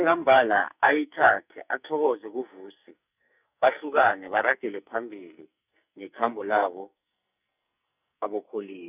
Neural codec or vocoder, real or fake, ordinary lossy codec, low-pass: codec, 44.1 kHz, 2.6 kbps, SNAC; fake; none; 3.6 kHz